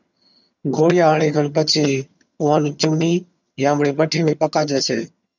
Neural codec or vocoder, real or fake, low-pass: vocoder, 22.05 kHz, 80 mel bands, HiFi-GAN; fake; 7.2 kHz